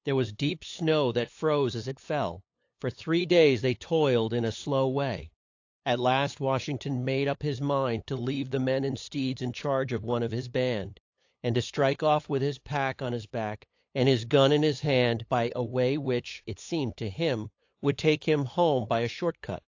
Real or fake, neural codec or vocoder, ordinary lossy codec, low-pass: fake; codec, 16 kHz, 8 kbps, FunCodec, trained on LibriTTS, 25 frames a second; AAC, 48 kbps; 7.2 kHz